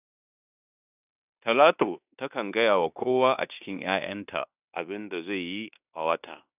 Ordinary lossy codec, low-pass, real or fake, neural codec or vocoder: none; 3.6 kHz; fake; codec, 16 kHz in and 24 kHz out, 0.9 kbps, LongCat-Audio-Codec, fine tuned four codebook decoder